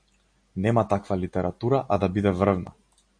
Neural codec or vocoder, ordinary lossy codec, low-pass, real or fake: none; MP3, 48 kbps; 9.9 kHz; real